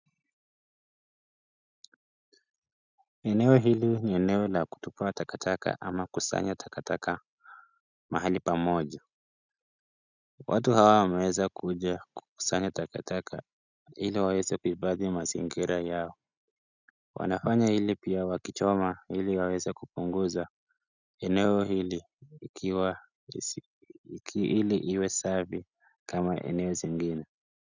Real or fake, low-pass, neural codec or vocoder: real; 7.2 kHz; none